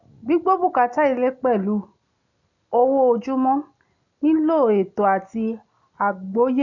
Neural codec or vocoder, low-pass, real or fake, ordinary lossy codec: none; 7.2 kHz; real; none